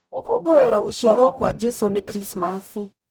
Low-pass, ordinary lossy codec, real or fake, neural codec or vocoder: none; none; fake; codec, 44.1 kHz, 0.9 kbps, DAC